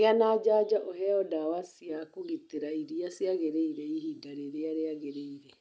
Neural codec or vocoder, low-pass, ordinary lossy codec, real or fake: none; none; none; real